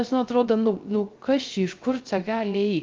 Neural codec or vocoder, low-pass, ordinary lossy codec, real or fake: codec, 16 kHz, 0.3 kbps, FocalCodec; 7.2 kHz; Opus, 24 kbps; fake